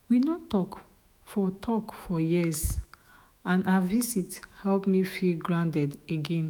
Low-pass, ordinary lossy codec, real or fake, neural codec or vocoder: none; none; fake; autoencoder, 48 kHz, 128 numbers a frame, DAC-VAE, trained on Japanese speech